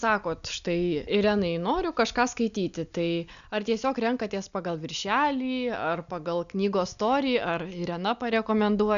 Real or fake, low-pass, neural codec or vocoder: real; 7.2 kHz; none